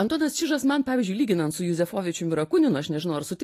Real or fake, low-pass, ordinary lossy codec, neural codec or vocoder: real; 14.4 kHz; AAC, 48 kbps; none